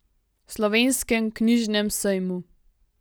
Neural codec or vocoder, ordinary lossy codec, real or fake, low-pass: none; none; real; none